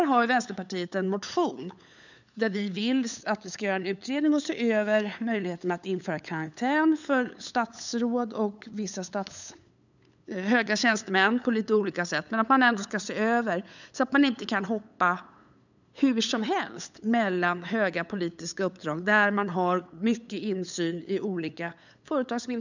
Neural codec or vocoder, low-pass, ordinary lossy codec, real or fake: codec, 16 kHz, 8 kbps, FunCodec, trained on LibriTTS, 25 frames a second; 7.2 kHz; none; fake